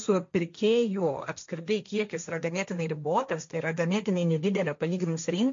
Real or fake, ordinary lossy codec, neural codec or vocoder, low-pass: fake; MP3, 48 kbps; codec, 16 kHz, 1.1 kbps, Voila-Tokenizer; 7.2 kHz